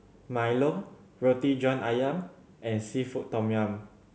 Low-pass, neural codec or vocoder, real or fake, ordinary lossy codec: none; none; real; none